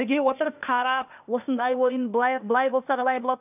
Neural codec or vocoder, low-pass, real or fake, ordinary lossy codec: codec, 16 kHz, 0.8 kbps, ZipCodec; 3.6 kHz; fake; none